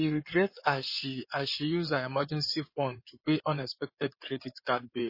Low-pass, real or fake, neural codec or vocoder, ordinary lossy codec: 5.4 kHz; fake; codec, 16 kHz in and 24 kHz out, 2.2 kbps, FireRedTTS-2 codec; MP3, 24 kbps